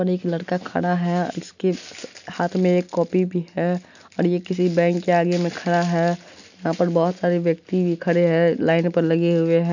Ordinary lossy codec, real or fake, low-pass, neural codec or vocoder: none; real; 7.2 kHz; none